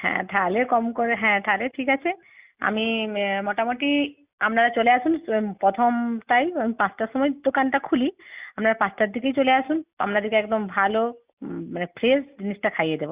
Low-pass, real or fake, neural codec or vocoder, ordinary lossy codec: 3.6 kHz; real; none; Opus, 64 kbps